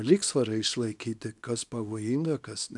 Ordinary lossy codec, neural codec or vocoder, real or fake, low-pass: MP3, 96 kbps; codec, 24 kHz, 0.9 kbps, WavTokenizer, small release; fake; 10.8 kHz